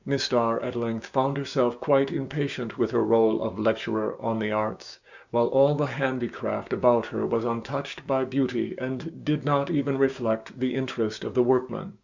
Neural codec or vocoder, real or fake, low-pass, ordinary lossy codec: codec, 16 kHz, 6 kbps, DAC; fake; 7.2 kHz; Opus, 64 kbps